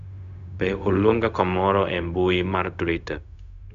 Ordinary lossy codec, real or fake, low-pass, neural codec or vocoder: none; fake; 7.2 kHz; codec, 16 kHz, 0.4 kbps, LongCat-Audio-Codec